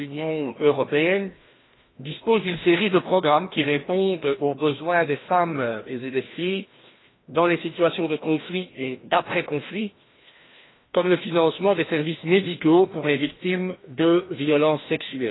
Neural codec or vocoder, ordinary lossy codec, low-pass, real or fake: codec, 16 kHz, 1 kbps, FreqCodec, larger model; AAC, 16 kbps; 7.2 kHz; fake